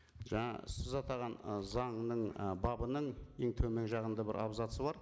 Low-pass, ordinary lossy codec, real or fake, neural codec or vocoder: none; none; real; none